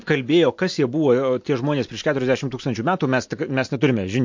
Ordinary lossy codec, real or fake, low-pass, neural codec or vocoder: MP3, 48 kbps; real; 7.2 kHz; none